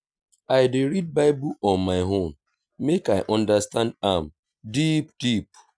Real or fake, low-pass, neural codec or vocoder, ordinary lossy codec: real; 9.9 kHz; none; none